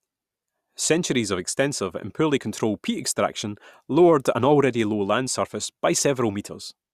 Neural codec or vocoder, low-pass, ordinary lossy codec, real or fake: none; 14.4 kHz; Opus, 64 kbps; real